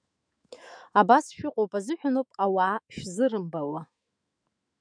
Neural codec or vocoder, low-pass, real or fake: autoencoder, 48 kHz, 128 numbers a frame, DAC-VAE, trained on Japanese speech; 9.9 kHz; fake